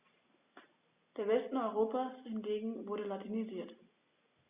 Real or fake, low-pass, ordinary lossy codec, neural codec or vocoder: real; 3.6 kHz; Opus, 64 kbps; none